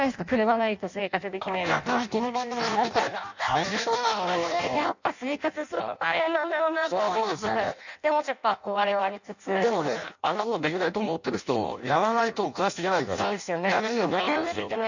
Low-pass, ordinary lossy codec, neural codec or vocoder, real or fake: 7.2 kHz; none; codec, 16 kHz in and 24 kHz out, 0.6 kbps, FireRedTTS-2 codec; fake